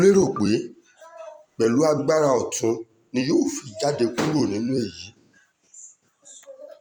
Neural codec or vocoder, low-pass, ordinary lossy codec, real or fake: vocoder, 44.1 kHz, 128 mel bands every 256 samples, BigVGAN v2; 19.8 kHz; none; fake